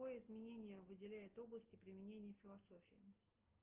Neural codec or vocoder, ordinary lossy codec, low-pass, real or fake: none; Opus, 16 kbps; 3.6 kHz; real